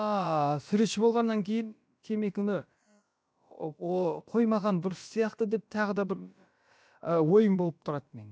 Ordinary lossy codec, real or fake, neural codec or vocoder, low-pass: none; fake; codec, 16 kHz, about 1 kbps, DyCAST, with the encoder's durations; none